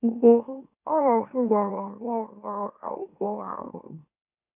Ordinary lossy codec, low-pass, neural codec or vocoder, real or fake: none; 3.6 kHz; autoencoder, 44.1 kHz, a latent of 192 numbers a frame, MeloTTS; fake